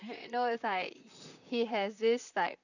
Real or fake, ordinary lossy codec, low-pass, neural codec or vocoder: fake; none; 7.2 kHz; vocoder, 44.1 kHz, 128 mel bands, Pupu-Vocoder